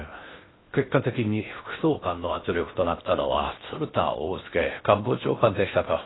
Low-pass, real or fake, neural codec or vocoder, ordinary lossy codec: 7.2 kHz; fake; codec, 16 kHz in and 24 kHz out, 0.6 kbps, FocalCodec, streaming, 2048 codes; AAC, 16 kbps